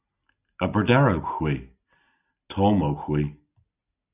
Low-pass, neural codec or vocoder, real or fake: 3.6 kHz; none; real